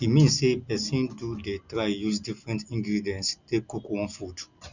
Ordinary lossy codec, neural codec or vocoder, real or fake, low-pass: none; none; real; 7.2 kHz